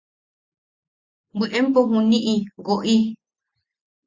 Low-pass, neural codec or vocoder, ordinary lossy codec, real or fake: 7.2 kHz; none; Opus, 64 kbps; real